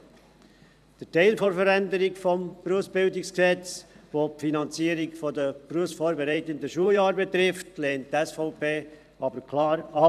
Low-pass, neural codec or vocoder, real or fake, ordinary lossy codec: 14.4 kHz; vocoder, 44.1 kHz, 128 mel bands every 512 samples, BigVGAN v2; fake; none